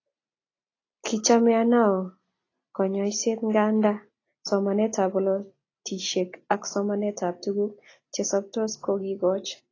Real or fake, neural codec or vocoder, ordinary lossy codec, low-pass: real; none; AAC, 32 kbps; 7.2 kHz